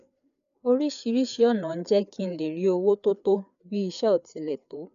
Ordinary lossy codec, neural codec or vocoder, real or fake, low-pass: none; codec, 16 kHz, 4 kbps, FreqCodec, larger model; fake; 7.2 kHz